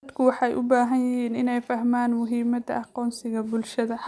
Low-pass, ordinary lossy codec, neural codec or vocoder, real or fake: none; none; none; real